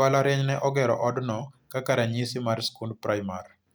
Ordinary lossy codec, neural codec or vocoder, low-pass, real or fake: none; none; none; real